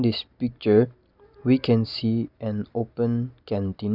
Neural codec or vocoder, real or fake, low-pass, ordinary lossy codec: none; real; 5.4 kHz; Opus, 64 kbps